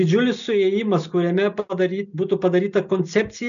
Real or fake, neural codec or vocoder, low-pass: real; none; 7.2 kHz